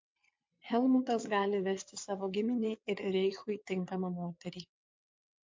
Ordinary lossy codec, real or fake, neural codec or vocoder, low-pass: MP3, 48 kbps; fake; vocoder, 22.05 kHz, 80 mel bands, WaveNeXt; 7.2 kHz